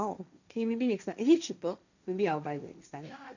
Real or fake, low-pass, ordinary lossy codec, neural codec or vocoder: fake; 7.2 kHz; none; codec, 16 kHz, 1.1 kbps, Voila-Tokenizer